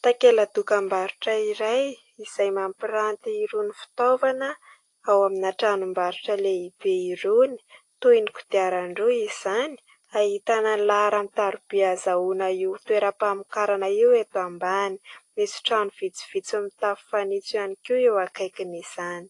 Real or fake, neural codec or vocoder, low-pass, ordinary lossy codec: real; none; 10.8 kHz; AAC, 48 kbps